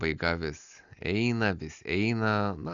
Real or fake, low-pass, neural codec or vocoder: real; 7.2 kHz; none